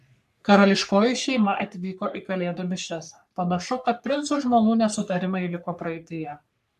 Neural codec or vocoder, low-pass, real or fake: codec, 44.1 kHz, 3.4 kbps, Pupu-Codec; 14.4 kHz; fake